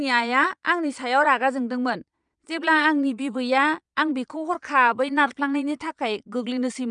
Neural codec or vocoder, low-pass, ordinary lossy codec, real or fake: vocoder, 22.05 kHz, 80 mel bands, Vocos; 9.9 kHz; none; fake